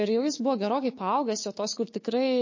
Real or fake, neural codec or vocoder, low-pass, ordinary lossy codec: fake; codec, 16 kHz, 6 kbps, DAC; 7.2 kHz; MP3, 32 kbps